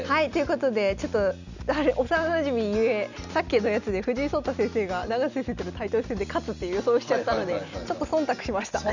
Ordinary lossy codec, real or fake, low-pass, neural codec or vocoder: none; real; 7.2 kHz; none